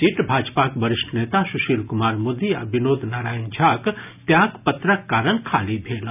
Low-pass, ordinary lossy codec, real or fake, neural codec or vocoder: 3.6 kHz; none; real; none